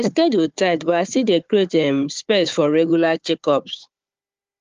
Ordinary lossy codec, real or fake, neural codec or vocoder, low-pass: Opus, 32 kbps; fake; codec, 16 kHz, 4 kbps, FunCodec, trained on Chinese and English, 50 frames a second; 7.2 kHz